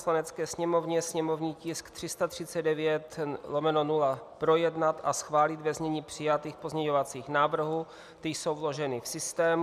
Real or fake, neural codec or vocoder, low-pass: real; none; 14.4 kHz